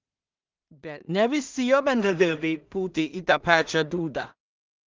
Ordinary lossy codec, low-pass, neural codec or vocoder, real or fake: Opus, 24 kbps; 7.2 kHz; codec, 16 kHz in and 24 kHz out, 0.4 kbps, LongCat-Audio-Codec, two codebook decoder; fake